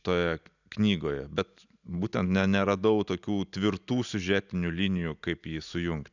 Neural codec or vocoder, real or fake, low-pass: none; real; 7.2 kHz